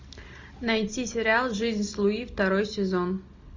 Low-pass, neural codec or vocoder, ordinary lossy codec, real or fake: 7.2 kHz; none; MP3, 48 kbps; real